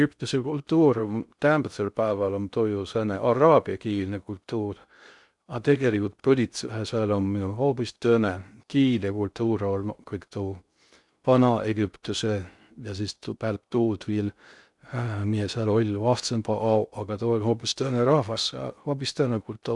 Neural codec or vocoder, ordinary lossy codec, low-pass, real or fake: codec, 16 kHz in and 24 kHz out, 0.6 kbps, FocalCodec, streaming, 2048 codes; none; 10.8 kHz; fake